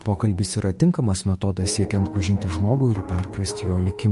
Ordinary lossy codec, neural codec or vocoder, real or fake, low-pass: MP3, 48 kbps; autoencoder, 48 kHz, 32 numbers a frame, DAC-VAE, trained on Japanese speech; fake; 14.4 kHz